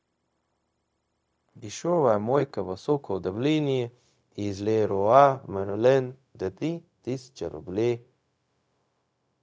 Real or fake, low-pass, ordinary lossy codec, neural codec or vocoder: fake; none; none; codec, 16 kHz, 0.4 kbps, LongCat-Audio-Codec